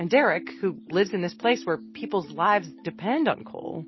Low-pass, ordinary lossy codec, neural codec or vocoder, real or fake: 7.2 kHz; MP3, 24 kbps; none; real